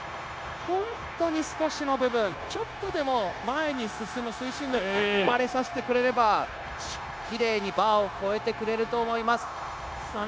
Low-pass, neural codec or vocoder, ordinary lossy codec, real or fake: none; codec, 16 kHz, 0.9 kbps, LongCat-Audio-Codec; none; fake